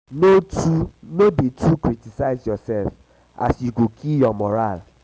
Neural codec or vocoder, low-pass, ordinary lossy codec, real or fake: none; none; none; real